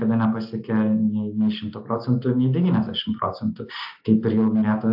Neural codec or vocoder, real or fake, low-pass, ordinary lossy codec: none; real; 5.4 kHz; AAC, 48 kbps